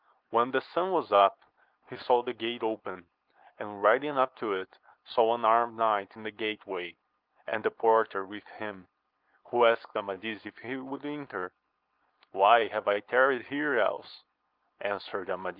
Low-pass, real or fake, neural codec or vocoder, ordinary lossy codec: 5.4 kHz; real; none; Opus, 24 kbps